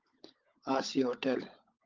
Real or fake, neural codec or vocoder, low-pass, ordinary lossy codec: fake; codec, 16 kHz, 16 kbps, FunCodec, trained on LibriTTS, 50 frames a second; 7.2 kHz; Opus, 32 kbps